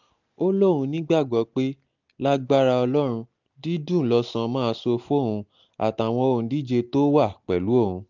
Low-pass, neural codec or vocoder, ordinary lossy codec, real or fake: 7.2 kHz; none; none; real